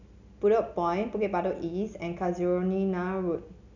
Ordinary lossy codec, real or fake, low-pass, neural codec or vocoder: none; real; 7.2 kHz; none